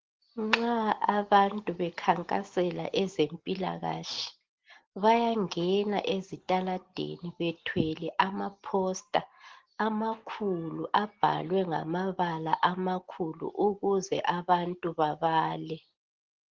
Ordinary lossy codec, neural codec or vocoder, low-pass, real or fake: Opus, 16 kbps; none; 7.2 kHz; real